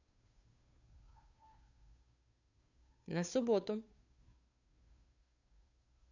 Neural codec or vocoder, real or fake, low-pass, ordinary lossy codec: codec, 16 kHz, 2 kbps, FunCodec, trained on Chinese and English, 25 frames a second; fake; 7.2 kHz; none